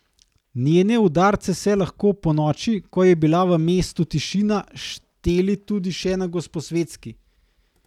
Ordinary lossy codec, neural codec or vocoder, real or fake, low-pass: none; none; real; 19.8 kHz